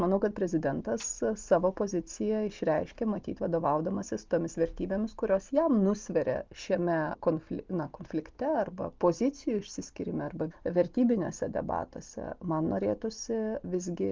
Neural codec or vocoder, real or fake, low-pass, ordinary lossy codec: none; real; 7.2 kHz; Opus, 32 kbps